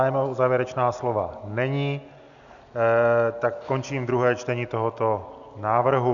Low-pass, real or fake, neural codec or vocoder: 7.2 kHz; real; none